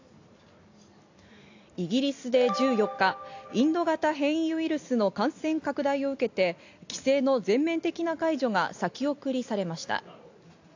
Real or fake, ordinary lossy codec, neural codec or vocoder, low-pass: real; AAC, 48 kbps; none; 7.2 kHz